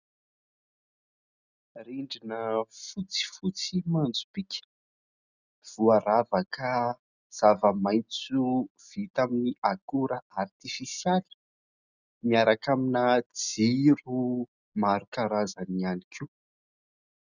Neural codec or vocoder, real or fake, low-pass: none; real; 7.2 kHz